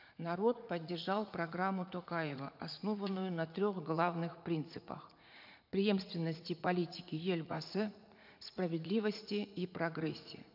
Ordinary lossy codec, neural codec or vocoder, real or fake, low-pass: MP3, 48 kbps; vocoder, 44.1 kHz, 80 mel bands, Vocos; fake; 5.4 kHz